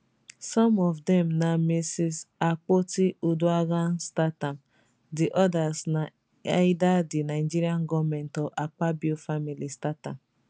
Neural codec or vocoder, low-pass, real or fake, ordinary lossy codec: none; none; real; none